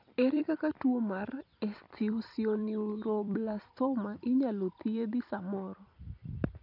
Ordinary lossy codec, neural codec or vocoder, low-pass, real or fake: none; vocoder, 44.1 kHz, 128 mel bands every 256 samples, BigVGAN v2; 5.4 kHz; fake